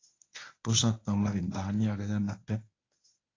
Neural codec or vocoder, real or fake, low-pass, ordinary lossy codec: codec, 24 kHz, 0.9 kbps, WavTokenizer, medium speech release version 1; fake; 7.2 kHz; AAC, 32 kbps